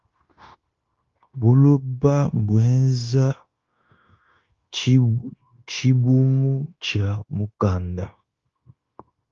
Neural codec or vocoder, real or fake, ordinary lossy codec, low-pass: codec, 16 kHz, 0.9 kbps, LongCat-Audio-Codec; fake; Opus, 32 kbps; 7.2 kHz